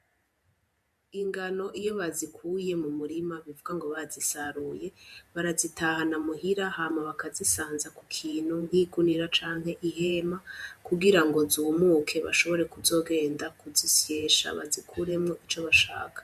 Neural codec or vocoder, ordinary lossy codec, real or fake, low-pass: vocoder, 48 kHz, 128 mel bands, Vocos; MP3, 96 kbps; fake; 14.4 kHz